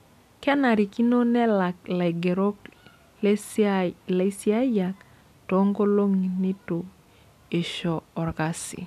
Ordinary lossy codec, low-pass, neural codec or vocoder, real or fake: none; 14.4 kHz; none; real